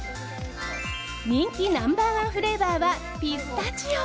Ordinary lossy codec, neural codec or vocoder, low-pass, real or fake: none; none; none; real